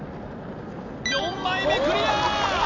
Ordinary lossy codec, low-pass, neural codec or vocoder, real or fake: AAC, 48 kbps; 7.2 kHz; none; real